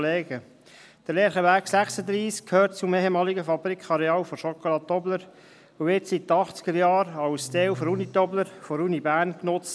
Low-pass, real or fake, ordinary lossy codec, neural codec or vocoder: none; real; none; none